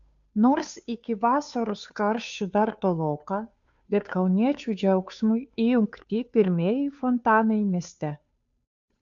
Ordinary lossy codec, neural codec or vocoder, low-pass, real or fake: MP3, 64 kbps; codec, 16 kHz, 2 kbps, FunCodec, trained on Chinese and English, 25 frames a second; 7.2 kHz; fake